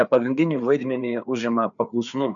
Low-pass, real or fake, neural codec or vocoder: 7.2 kHz; fake; codec, 16 kHz, 4 kbps, FreqCodec, larger model